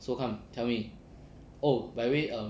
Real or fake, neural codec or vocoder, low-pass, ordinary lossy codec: real; none; none; none